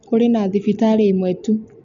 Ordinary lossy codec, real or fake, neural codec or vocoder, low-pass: none; real; none; 7.2 kHz